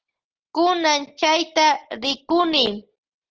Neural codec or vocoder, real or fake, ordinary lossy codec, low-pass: none; real; Opus, 16 kbps; 7.2 kHz